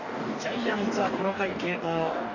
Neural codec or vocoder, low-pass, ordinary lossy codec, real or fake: codec, 44.1 kHz, 2.6 kbps, DAC; 7.2 kHz; none; fake